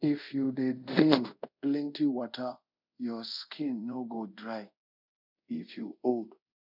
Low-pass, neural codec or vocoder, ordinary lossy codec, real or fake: 5.4 kHz; codec, 24 kHz, 0.5 kbps, DualCodec; none; fake